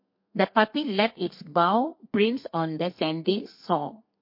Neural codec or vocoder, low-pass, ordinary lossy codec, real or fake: codec, 32 kHz, 1.9 kbps, SNAC; 5.4 kHz; MP3, 32 kbps; fake